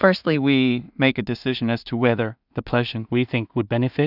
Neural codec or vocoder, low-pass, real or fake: codec, 16 kHz in and 24 kHz out, 0.4 kbps, LongCat-Audio-Codec, two codebook decoder; 5.4 kHz; fake